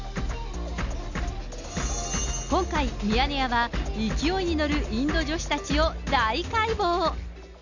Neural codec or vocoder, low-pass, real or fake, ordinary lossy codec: none; 7.2 kHz; real; none